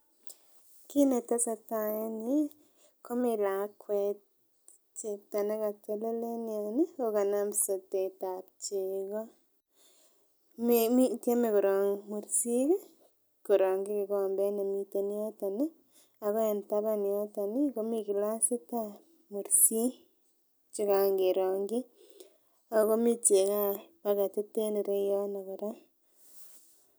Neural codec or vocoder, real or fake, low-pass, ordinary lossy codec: none; real; none; none